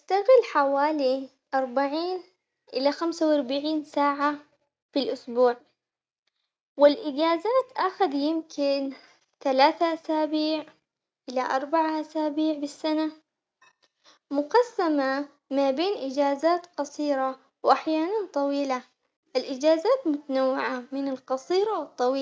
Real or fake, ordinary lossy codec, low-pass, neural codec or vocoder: real; none; none; none